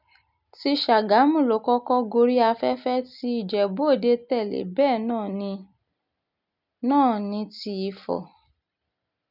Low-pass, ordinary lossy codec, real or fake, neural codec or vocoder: 5.4 kHz; none; real; none